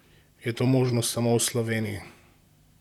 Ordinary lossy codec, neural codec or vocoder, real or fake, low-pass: none; vocoder, 44.1 kHz, 128 mel bands, Pupu-Vocoder; fake; 19.8 kHz